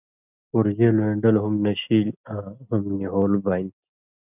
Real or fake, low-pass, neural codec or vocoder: real; 3.6 kHz; none